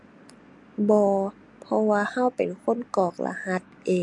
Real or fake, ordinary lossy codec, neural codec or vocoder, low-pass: real; MP3, 64 kbps; none; 10.8 kHz